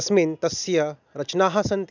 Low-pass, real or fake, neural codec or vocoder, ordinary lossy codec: 7.2 kHz; real; none; none